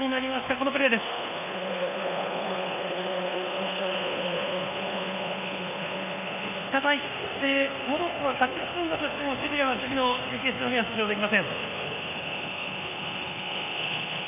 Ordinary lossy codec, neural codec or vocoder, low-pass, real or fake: none; codec, 24 kHz, 1.2 kbps, DualCodec; 3.6 kHz; fake